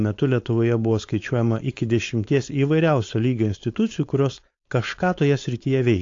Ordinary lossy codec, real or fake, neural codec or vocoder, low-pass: AAC, 48 kbps; fake; codec, 16 kHz, 4.8 kbps, FACodec; 7.2 kHz